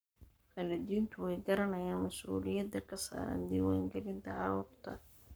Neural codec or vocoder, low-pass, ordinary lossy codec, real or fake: codec, 44.1 kHz, 7.8 kbps, Pupu-Codec; none; none; fake